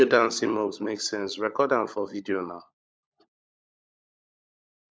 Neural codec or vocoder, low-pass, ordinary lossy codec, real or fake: codec, 16 kHz, 16 kbps, FunCodec, trained on LibriTTS, 50 frames a second; none; none; fake